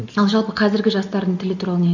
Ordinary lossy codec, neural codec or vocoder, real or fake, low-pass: none; none; real; 7.2 kHz